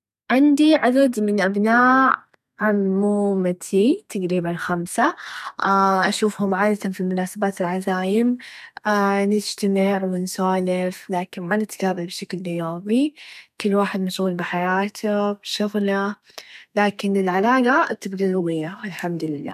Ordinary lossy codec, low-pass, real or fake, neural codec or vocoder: none; 14.4 kHz; fake; codec, 32 kHz, 1.9 kbps, SNAC